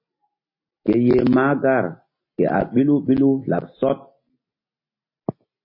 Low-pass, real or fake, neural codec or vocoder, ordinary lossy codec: 5.4 kHz; real; none; MP3, 24 kbps